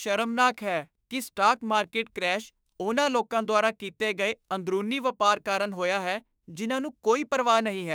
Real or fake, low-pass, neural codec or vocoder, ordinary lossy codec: fake; none; autoencoder, 48 kHz, 32 numbers a frame, DAC-VAE, trained on Japanese speech; none